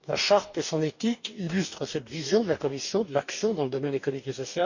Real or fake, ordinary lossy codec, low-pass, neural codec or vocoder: fake; none; 7.2 kHz; codec, 44.1 kHz, 2.6 kbps, DAC